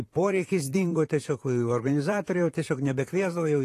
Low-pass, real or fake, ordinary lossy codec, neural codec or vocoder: 14.4 kHz; fake; AAC, 48 kbps; vocoder, 44.1 kHz, 128 mel bands, Pupu-Vocoder